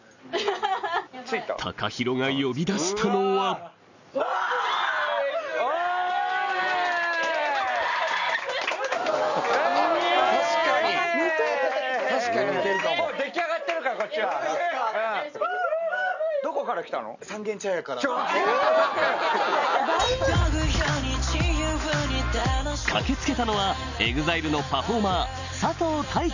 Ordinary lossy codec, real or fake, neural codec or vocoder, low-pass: none; real; none; 7.2 kHz